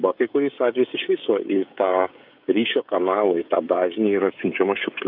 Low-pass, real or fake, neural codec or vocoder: 5.4 kHz; fake; codec, 16 kHz, 16 kbps, FreqCodec, smaller model